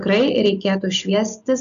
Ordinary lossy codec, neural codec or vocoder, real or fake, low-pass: AAC, 96 kbps; none; real; 7.2 kHz